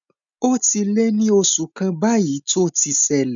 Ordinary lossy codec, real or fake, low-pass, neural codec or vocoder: none; real; 7.2 kHz; none